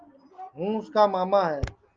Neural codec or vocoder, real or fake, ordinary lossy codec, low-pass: none; real; Opus, 32 kbps; 7.2 kHz